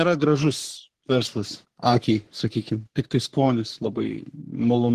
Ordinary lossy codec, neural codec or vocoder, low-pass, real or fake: Opus, 16 kbps; codec, 44.1 kHz, 3.4 kbps, Pupu-Codec; 14.4 kHz; fake